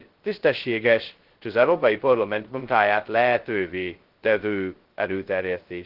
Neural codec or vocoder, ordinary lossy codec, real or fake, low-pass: codec, 16 kHz, 0.2 kbps, FocalCodec; Opus, 16 kbps; fake; 5.4 kHz